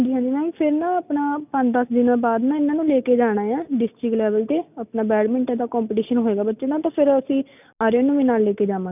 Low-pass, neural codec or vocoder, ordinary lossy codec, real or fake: 3.6 kHz; none; none; real